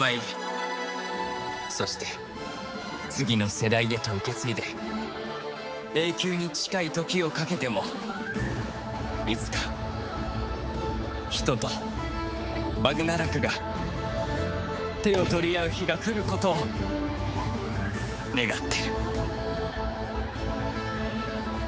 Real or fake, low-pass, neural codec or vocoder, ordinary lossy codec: fake; none; codec, 16 kHz, 4 kbps, X-Codec, HuBERT features, trained on general audio; none